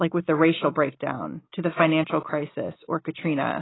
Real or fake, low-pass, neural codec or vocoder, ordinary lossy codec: real; 7.2 kHz; none; AAC, 16 kbps